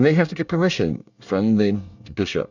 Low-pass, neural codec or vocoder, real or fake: 7.2 kHz; codec, 24 kHz, 1 kbps, SNAC; fake